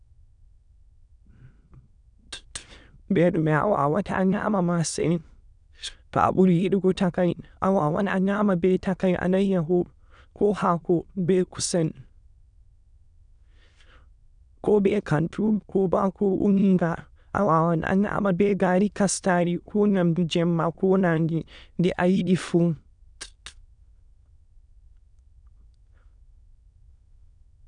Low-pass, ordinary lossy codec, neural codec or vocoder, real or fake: 9.9 kHz; none; autoencoder, 22.05 kHz, a latent of 192 numbers a frame, VITS, trained on many speakers; fake